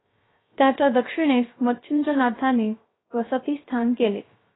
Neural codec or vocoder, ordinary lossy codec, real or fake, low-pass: codec, 16 kHz, 0.3 kbps, FocalCodec; AAC, 16 kbps; fake; 7.2 kHz